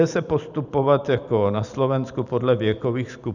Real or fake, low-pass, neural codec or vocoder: fake; 7.2 kHz; vocoder, 44.1 kHz, 128 mel bands every 512 samples, BigVGAN v2